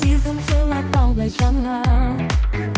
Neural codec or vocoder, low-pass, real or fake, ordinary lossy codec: codec, 16 kHz, 1 kbps, X-Codec, HuBERT features, trained on balanced general audio; none; fake; none